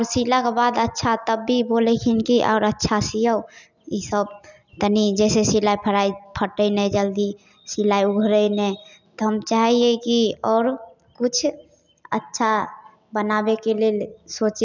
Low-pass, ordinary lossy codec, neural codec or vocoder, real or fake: 7.2 kHz; none; none; real